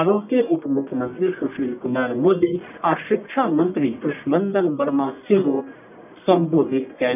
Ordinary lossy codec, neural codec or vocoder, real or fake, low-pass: none; codec, 44.1 kHz, 1.7 kbps, Pupu-Codec; fake; 3.6 kHz